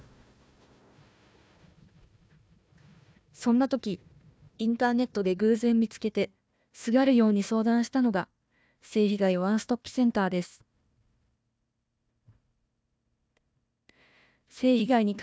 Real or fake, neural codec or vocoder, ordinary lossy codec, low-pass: fake; codec, 16 kHz, 1 kbps, FunCodec, trained on Chinese and English, 50 frames a second; none; none